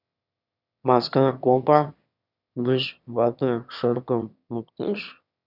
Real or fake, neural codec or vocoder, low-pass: fake; autoencoder, 22.05 kHz, a latent of 192 numbers a frame, VITS, trained on one speaker; 5.4 kHz